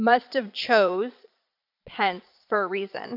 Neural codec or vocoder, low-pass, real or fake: vocoder, 22.05 kHz, 80 mel bands, Vocos; 5.4 kHz; fake